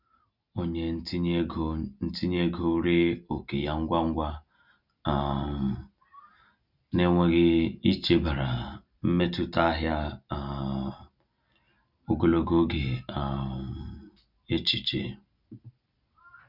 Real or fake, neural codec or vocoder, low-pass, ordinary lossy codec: real; none; 5.4 kHz; none